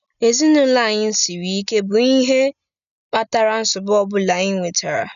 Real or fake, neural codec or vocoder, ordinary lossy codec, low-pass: real; none; none; 7.2 kHz